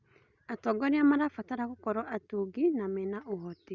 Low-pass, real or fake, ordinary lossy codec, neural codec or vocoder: 7.2 kHz; real; Opus, 64 kbps; none